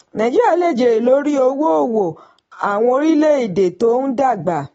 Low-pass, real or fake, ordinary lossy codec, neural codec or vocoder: 19.8 kHz; real; AAC, 24 kbps; none